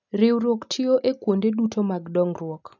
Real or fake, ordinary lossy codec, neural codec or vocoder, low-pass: real; none; none; 7.2 kHz